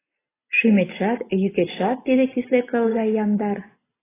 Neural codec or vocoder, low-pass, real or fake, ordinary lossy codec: none; 3.6 kHz; real; AAC, 16 kbps